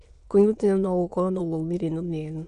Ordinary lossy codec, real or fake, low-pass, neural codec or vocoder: Opus, 64 kbps; fake; 9.9 kHz; autoencoder, 22.05 kHz, a latent of 192 numbers a frame, VITS, trained on many speakers